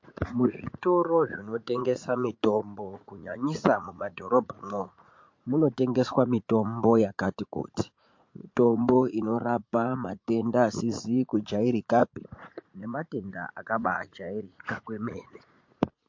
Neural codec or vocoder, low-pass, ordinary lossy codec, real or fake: vocoder, 44.1 kHz, 80 mel bands, Vocos; 7.2 kHz; MP3, 48 kbps; fake